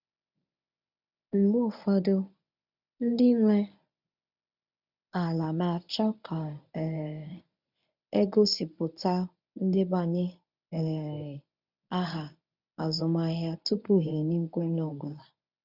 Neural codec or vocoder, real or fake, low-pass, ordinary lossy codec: codec, 24 kHz, 0.9 kbps, WavTokenizer, medium speech release version 1; fake; 5.4 kHz; none